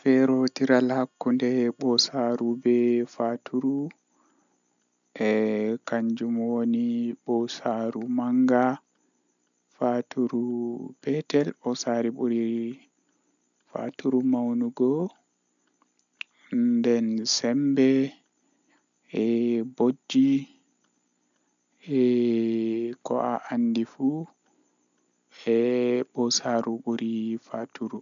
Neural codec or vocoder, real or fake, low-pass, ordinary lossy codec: none; real; 7.2 kHz; none